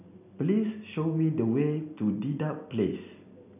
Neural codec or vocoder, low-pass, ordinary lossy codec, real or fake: none; 3.6 kHz; none; real